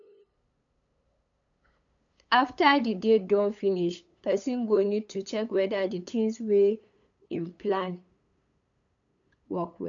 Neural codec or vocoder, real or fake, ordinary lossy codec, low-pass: codec, 16 kHz, 8 kbps, FunCodec, trained on LibriTTS, 25 frames a second; fake; MP3, 64 kbps; 7.2 kHz